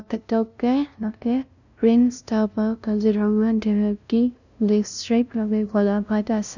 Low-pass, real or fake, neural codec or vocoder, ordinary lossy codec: 7.2 kHz; fake; codec, 16 kHz, 0.5 kbps, FunCodec, trained on LibriTTS, 25 frames a second; none